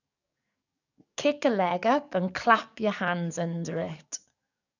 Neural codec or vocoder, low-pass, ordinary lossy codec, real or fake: codec, 44.1 kHz, 7.8 kbps, DAC; 7.2 kHz; none; fake